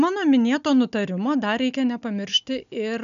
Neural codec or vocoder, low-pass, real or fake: none; 7.2 kHz; real